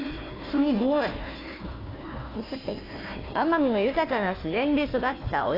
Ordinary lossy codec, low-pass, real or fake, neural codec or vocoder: AAC, 24 kbps; 5.4 kHz; fake; codec, 16 kHz, 1 kbps, FunCodec, trained on Chinese and English, 50 frames a second